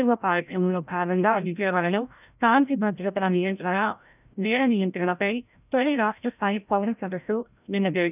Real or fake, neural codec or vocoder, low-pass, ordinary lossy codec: fake; codec, 16 kHz, 0.5 kbps, FreqCodec, larger model; 3.6 kHz; none